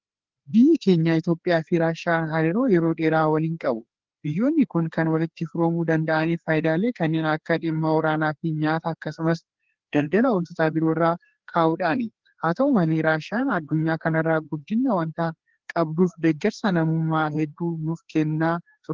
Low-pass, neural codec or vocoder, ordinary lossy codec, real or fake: 7.2 kHz; codec, 16 kHz, 2 kbps, FreqCodec, larger model; Opus, 16 kbps; fake